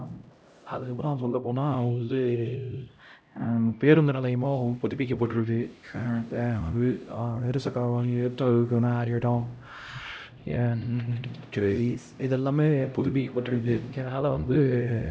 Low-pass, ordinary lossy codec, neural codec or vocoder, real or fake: none; none; codec, 16 kHz, 0.5 kbps, X-Codec, HuBERT features, trained on LibriSpeech; fake